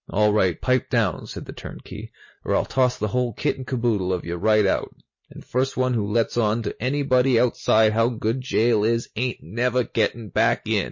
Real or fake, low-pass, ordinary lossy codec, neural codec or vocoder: real; 7.2 kHz; MP3, 32 kbps; none